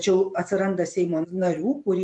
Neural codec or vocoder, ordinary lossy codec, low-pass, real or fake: vocoder, 44.1 kHz, 128 mel bands every 512 samples, BigVGAN v2; MP3, 64 kbps; 10.8 kHz; fake